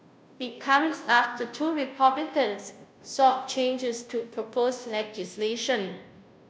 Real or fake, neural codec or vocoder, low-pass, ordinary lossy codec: fake; codec, 16 kHz, 0.5 kbps, FunCodec, trained on Chinese and English, 25 frames a second; none; none